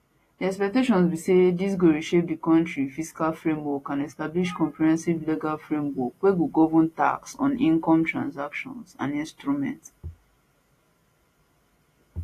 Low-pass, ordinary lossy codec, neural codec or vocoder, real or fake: 14.4 kHz; AAC, 48 kbps; none; real